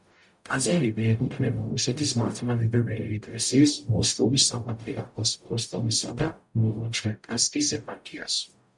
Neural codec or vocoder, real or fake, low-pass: codec, 44.1 kHz, 0.9 kbps, DAC; fake; 10.8 kHz